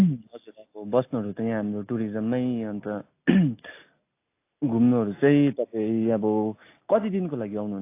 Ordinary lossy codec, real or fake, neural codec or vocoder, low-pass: AAC, 24 kbps; real; none; 3.6 kHz